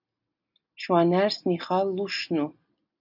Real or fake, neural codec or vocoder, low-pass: real; none; 5.4 kHz